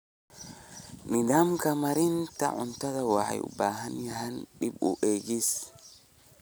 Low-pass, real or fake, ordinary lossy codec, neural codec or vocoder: none; real; none; none